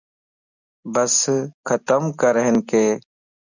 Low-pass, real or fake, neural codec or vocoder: 7.2 kHz; real; none